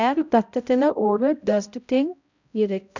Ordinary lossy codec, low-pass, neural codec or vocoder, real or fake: none; 7.2 kHz; codec, 16 kHz, 0.5 kbps, X-Codec, HuBERT features, trained on balanced general audio; fake